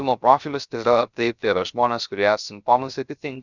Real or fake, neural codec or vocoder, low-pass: fake; codec, 16 kHz, 0.3 kbps, FocalCodec; 7.2 kHz